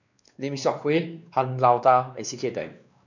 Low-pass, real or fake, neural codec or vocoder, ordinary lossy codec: 7.2 kHz; fake; codec, 16 kHz, 2 kbps, X-Codec, WavLM features, trained on Multilingual LibriSpeech; none